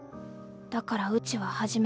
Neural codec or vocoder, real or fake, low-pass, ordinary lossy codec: none; real; none; none